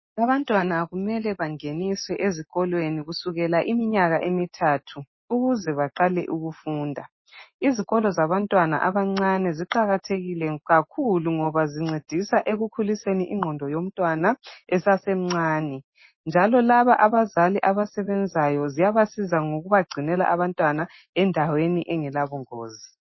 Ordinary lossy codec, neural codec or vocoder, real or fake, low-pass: MP3, 24 kbps; none; real; 7.2 kHz